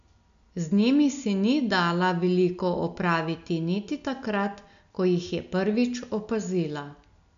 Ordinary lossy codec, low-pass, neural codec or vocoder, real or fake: none; 7.2 kHz; none; real